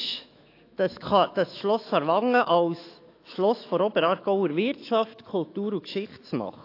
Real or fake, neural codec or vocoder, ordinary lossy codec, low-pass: fake; autoencoder, 48 kHz, 128 numbers a frame, DAC-VAE, trained on Japanese speech; AAC, 32 kbps; 5.4 kHz